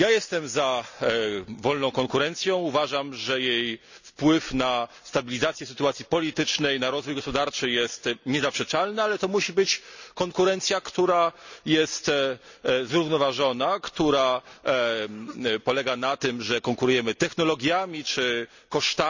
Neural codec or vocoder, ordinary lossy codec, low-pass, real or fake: none; none; 7.2 kHz; real